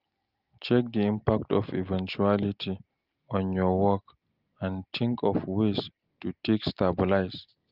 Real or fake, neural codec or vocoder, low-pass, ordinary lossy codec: real; none; 5.4 kHz; Opus, 32 kbps